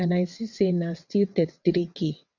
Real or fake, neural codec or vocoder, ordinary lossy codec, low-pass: fake; vocoder, 22.05 kHz, 80 mel bands, WaveNeXt; none; 7.2 kHz